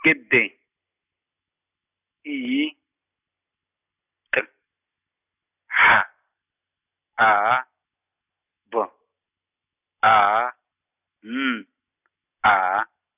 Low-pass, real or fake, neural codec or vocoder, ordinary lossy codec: 3.6 kHz; real; none; none